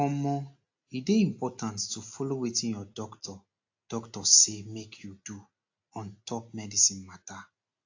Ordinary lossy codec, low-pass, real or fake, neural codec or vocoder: AAC, 48 kbps; 7.2 kHz; real; none